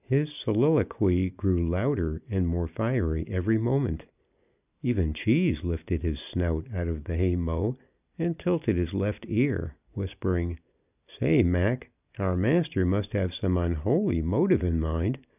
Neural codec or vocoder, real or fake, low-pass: none; real; 3.6 kHz